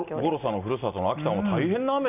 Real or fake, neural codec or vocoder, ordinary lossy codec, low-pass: real; none; none; 3.6 kHz